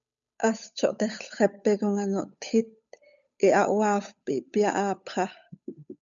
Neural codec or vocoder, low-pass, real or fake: codec, 16 kHz, 8 kbps, FunCodec, trained on Chinese and English, 25 frames a second; 7.2 kHz; fake